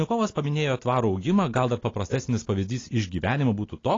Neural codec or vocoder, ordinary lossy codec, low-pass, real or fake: none; AAC, 32 kbps; 7.2 kHz; real